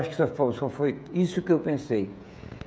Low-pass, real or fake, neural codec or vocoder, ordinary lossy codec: none; fake; codec, 16 kHz, 16 kbps, FreqCodec, smaller model; none